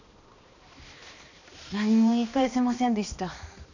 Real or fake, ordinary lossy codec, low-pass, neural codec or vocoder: fake; none; 7.2 kHz; codec, 16 kHz, 2 kbps, X-Codec, HuBERT features, trained on balanced general audio